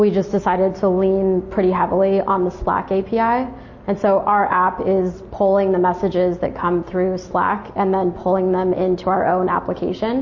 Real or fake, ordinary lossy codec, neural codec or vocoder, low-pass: real; MP3, 32 kbps; none; 7.2 kHz